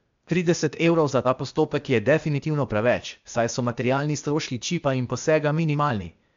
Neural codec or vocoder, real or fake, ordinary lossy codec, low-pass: codec, 16 kHz, 0.8 kbps, ZipCodec; fake; MP3, 64 kbps; 7.2 kHz